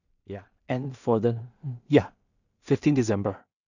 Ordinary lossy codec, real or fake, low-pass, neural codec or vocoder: none; fake; 7.2 kHz; codec, 16 kHz in and 24 kHz out, 0.4 kbps, LongCat-Audio-Codec, two codebook decoder